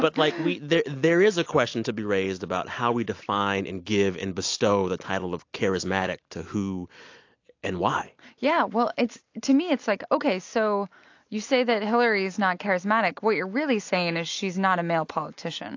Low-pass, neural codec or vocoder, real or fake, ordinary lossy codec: 7.2 kHz; none; real; AAC, 48 kbps